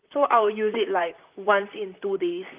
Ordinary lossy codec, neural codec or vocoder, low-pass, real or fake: Opus, 24 kbps; vocoder, 44.1 kHz, 128 mel bands, Pupu-Vocoder; 3.6 kHz; fake